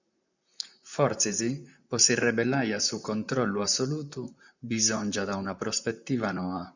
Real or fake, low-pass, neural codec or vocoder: fake; 7.2 kHz; vocoder, 44.1 kHz, 128 mel bands, Pupu-Vocoder